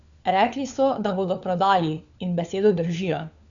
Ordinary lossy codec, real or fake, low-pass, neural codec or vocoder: none; fake; 7.2 kHz; codec, 16 kHz, 4 kbps, FunCodec, trained on LibriTTS, 50 frames a second